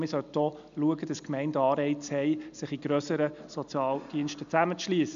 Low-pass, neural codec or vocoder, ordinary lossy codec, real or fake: 7.2 kHz; none; MP3, 64 kbps; real